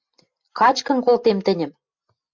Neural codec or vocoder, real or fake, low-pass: none; real; 7.2 kHz